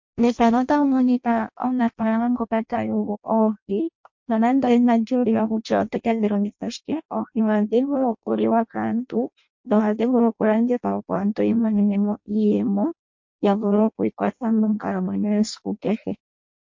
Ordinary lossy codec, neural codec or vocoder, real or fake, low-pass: MP3, 48 kbps; codec, 16 kHz in and 24 kHz out, 0.6 kbps, FireRedTTS-2 codec; fake; 7.2 kHz